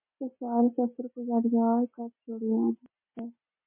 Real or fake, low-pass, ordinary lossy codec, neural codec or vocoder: real; 3.6 kHz; MP3, 24 kbps; none